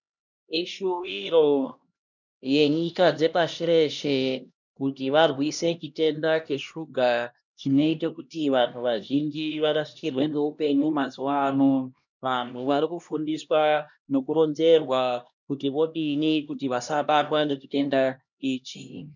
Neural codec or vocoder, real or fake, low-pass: codec, 16 kHz, 1 kbps, X-Codec, HuBERT features, trained on LibriSpeech; fake; 7.2 kHz